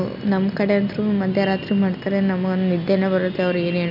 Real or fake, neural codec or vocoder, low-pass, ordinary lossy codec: real; none; 5.4 kHz; none